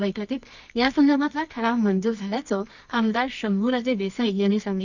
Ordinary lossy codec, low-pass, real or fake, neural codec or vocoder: none; 7.2 kHz; fake; codec, 24 kHz, 0.9 kbps, WavTokenizer, medium music audio release